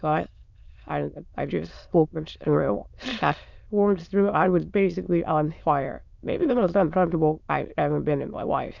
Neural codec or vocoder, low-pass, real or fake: autoencoder, 22.05 kHz, a latent of 192 numbers a frame, VITS, trained on many speakers; 7.2 kHz; fake